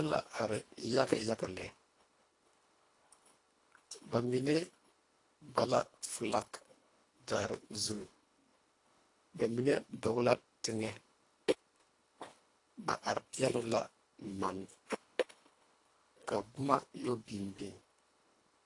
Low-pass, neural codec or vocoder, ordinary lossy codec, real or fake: 10.8 kHz; codec, 24 kHz, 1.5 kbps, HILCodec; AAC, 48 kbps; fake